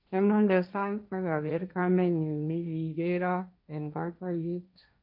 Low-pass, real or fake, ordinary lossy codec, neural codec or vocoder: 5.4 kHz; fake; none; codec, 16 kHz, 1.1 kbps, Voila-Tokenizer